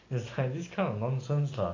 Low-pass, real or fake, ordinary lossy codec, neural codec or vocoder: 7.2 kHz; real; AAC, 32 kbps; none